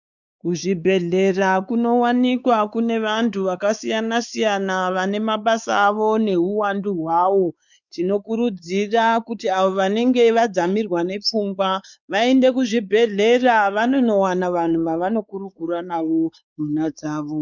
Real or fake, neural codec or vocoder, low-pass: fake; codec, 16 kHz, 4 kbps, X-Codec, WavLM features, trained on Multilingual LibriSpeech; 7.2 kHz